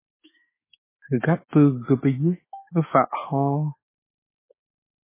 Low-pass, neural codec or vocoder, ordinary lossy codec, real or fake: 3.6 kHz; autoencoder, 48 kHz, 32 numbers a frame, DAC-VAE, trained on Japanese speech; MP3, 16 kbps; fake